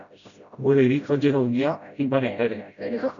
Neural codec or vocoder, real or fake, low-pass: codec, 16 kHz, 0.5 kbps, FreqCodec, smaller model; fake; 7.2 kHz